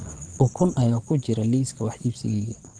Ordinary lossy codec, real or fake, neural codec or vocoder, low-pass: Opus, 16 kbps; fake; vocoder, 22.05 kHz, 80 mel bands, Vocos; 9.9 kHz